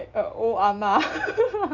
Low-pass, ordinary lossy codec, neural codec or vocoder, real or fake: 7.2 kHz; none; none; real